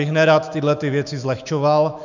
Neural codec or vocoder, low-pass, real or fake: autoencoder, 48 kHz, 128 numbers a frame, DAC-VAE, trained on Japanese speech; 7.2 kHz; fake